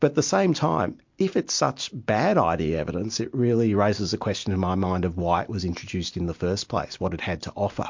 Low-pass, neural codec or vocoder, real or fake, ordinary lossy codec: 7.2 kHz; none; real; MP3, 48 kbps